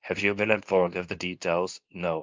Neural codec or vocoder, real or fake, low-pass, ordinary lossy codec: codec, 24 kHz, 0.9 kbps, WavTokenizer, medium speech release version 2; fake; 7.2 kHz; Opus, 24 kbps